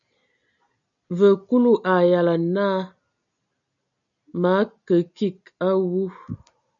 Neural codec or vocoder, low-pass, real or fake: none; 7.2 kHz; real